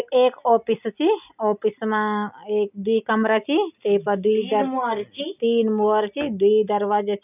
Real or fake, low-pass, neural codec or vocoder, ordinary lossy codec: real; 3.6 kHz; none; none